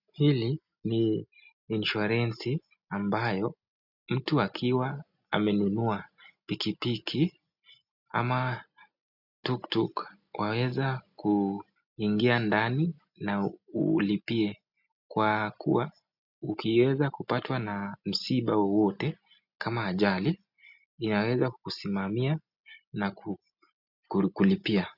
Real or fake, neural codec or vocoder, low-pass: real; none; 5.4 kHz